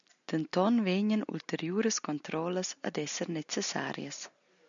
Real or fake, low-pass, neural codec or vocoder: real; 7.2 kHz; none